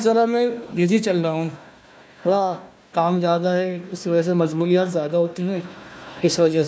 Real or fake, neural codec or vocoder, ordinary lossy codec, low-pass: fake; codec, 16 kHz, 1 kbps, FunCodec, trained on Chinese and English, 50 frames a second; none; none